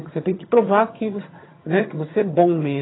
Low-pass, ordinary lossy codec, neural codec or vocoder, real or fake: 7.2 kHz; AAC, 16 kbps; vocoder, 22.05 kHz, 80 mel bands, HiFi-GAN; fake